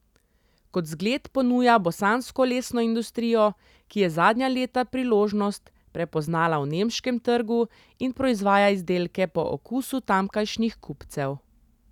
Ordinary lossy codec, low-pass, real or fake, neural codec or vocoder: none; 19.8 kHz; real; none